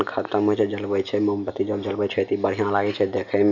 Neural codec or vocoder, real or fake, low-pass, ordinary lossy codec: none; real; 7.2 kHz; none